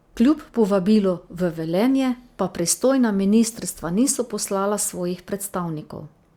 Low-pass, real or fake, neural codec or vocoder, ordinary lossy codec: 19.8 kHz; real; none; Opus, 64 kbps